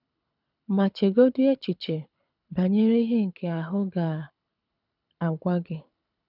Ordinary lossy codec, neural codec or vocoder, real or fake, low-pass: none; codec, 24 kHz, 6 kbps, HILCodec; fake; 5.4 kHz